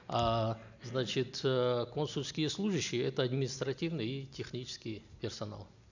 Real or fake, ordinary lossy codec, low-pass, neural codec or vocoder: real; none; 7.2 kHz; none